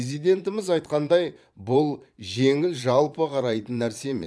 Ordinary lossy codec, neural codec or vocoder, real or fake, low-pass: none; vocoder, 22.05 kHz, 80 mel bands, Vocos; fake; none